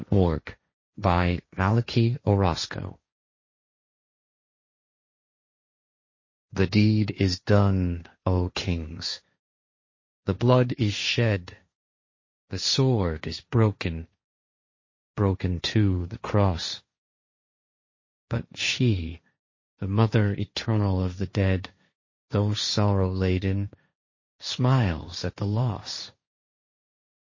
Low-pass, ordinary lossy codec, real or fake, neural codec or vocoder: 7.2 kHz; MP3, 32 kbps; fake; codec, 16 kHz, 1.1 kbps, Voila-Tokenizer